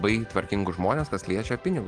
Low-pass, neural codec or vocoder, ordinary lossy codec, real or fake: 9.9 kHz; none; Opus, 24 kbps; real